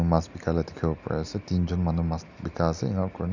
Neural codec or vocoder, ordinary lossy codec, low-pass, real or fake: none; none; 7.2 kHz; real